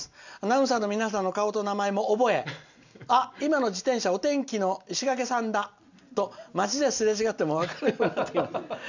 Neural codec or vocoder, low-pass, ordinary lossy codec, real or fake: none; 7.2 kHz; none; real